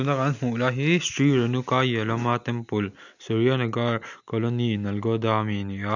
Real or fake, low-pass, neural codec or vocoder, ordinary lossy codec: real; 7.2 kHz; none; none